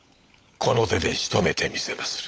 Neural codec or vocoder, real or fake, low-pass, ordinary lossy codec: codec, 16 kHz, 16 kbps, FunCodec, trained on LibriTTS, 50 frames a second; fake; none; none